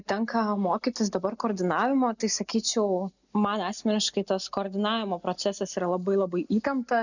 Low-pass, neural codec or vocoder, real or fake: 7.2 kHz; none; real